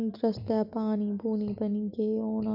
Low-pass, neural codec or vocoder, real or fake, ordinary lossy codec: 5.4 kHz; none; real; none